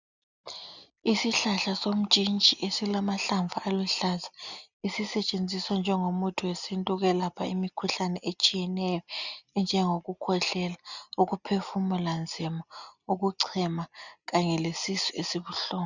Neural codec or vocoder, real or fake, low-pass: none; real; 7.2 kHz